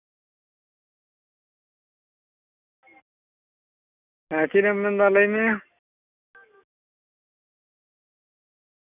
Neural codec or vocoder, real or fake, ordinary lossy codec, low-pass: none; real; none; 3.6 kHz